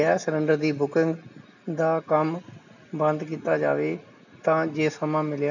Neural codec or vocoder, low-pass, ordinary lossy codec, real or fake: none; 7.2 kHz; none; real